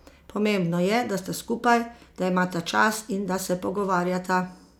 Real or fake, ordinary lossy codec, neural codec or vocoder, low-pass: real; none; none; 19.8 kHz